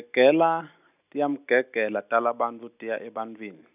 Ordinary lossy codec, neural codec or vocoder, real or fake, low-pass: none; none; real; 3.6 kHz